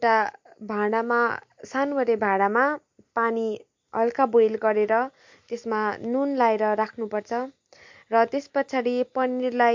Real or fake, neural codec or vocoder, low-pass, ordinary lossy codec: real; none; 7.2 kHz; MP3, 48 kbps